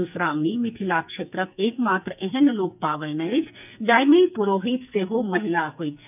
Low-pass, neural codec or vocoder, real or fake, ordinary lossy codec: 3.6 kHz; codec, 44.1 kHz, 2.6 kbps, SNAC; fake; none